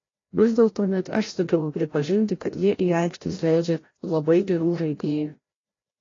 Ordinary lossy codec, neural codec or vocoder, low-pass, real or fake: AAC, 32 kbps; codec, 16 kHz, 0.5 kbps, FreqCodec, larger model; 7.2 kHz; fake